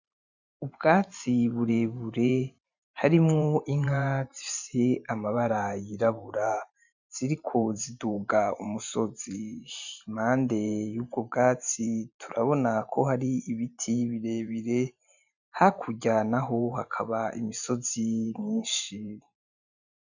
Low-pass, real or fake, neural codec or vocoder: 7.2 kHz; fake; vocoder, 44.1 kHz, 128 mel bands every 512 samples, BigVGAN v2